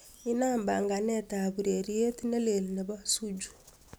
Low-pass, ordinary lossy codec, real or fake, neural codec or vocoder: none; none; real; none